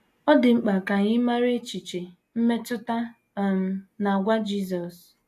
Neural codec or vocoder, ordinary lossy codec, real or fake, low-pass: none; AAC, 64 kbps; real; 14.4 kHz